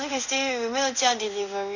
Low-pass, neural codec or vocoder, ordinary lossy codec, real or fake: 7.2 kHz; codec, 16 kHz in and 24 kHz out, 1 kbps, XY-Tokenizer; Opus, 64 kbps; fake